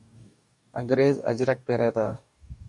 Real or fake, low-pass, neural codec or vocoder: fake; 10.8 kHz; codec, 44.1 kHz, 2.6 kbps, DAC